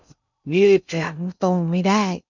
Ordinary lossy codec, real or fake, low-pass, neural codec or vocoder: none; fake; 7.2 kHz; codec, 16 kHz in and 24 kHz out, 0.6 kbps, FocalCodec, streaming, 2048 codes